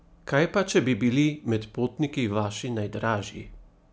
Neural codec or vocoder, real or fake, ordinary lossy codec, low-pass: none; real; none; none